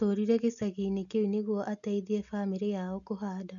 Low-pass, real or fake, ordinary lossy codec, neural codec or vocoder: 7.2 kHz; real; none; none